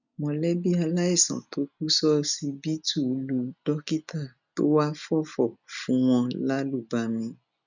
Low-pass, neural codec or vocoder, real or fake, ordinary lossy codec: 7.2 kHz; none; real; none